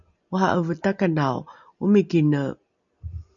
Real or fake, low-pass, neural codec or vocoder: real; 7.2 kHz; none